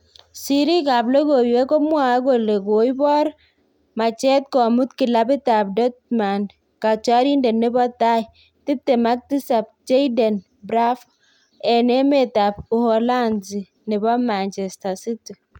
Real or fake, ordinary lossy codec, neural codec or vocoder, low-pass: real; none; none; 19.8 kHz